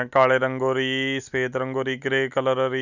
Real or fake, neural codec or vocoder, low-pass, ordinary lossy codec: real; none; 7.2 kHz; none